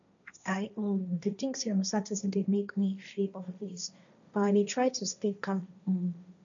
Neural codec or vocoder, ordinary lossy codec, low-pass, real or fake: codec, 16 kHz, 1.1 kbps, Voila-Tokenizer; none; 7.2 kHz; fake